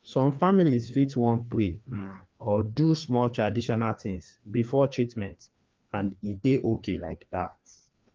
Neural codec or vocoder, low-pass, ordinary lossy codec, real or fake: codec, 16 kHz, 2 kbps, FreqCodec, larger model; 7.2 kHz; Opus, 24 kbps; fake